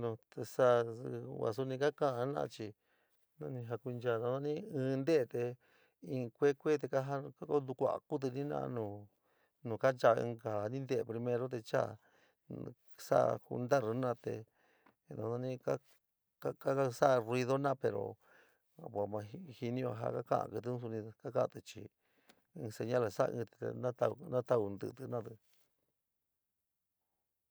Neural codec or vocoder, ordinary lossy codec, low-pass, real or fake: none; none; none; real